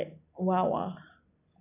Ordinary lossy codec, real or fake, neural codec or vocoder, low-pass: none; real; none; 3.6 kHz